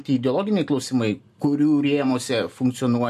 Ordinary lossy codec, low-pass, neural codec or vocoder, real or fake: MP3, 64 kbps; 14.4 kHz; none; real